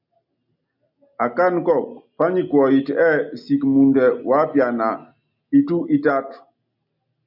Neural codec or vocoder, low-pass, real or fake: none; 5.4 kHz; real